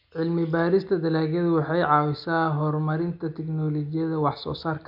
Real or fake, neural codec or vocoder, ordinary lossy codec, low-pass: real; none; none; 5.4 kHz